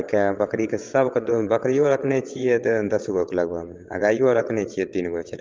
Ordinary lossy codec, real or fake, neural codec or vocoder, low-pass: Opus, 32 kbps; fake; vocoder, 22.05 kHz, 80 mel bands, WaveNeXt; 7.2 kHz